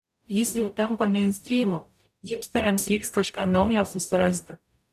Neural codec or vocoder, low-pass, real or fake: codec, 44.1 kHz, 0.9 kbps, DAC; 14.4 kHz; fake